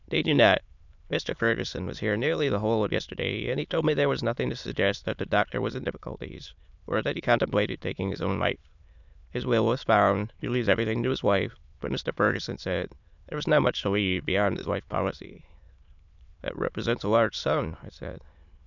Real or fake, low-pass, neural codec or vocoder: fake; 7.2 kHz; autoencoder, 22.05 kHz, a latent of 192 numbers a frame, VITS, trained on many speakers